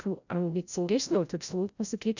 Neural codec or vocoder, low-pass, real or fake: codec, 16 kHz, 0.5 kbps, FreqCodec, larger model; 7.2 kHz; fake